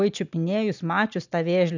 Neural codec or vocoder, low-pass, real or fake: none; 7.2 kHz; real